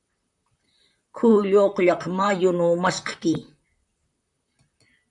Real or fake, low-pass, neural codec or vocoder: fake; 10.8 kHz; vocoder, 44.1 kHz, 128 mel bands, Pupu-Vocoder